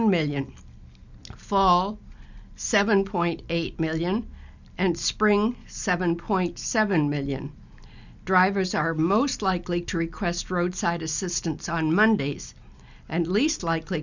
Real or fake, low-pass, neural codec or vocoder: real; 7.2 kHz; none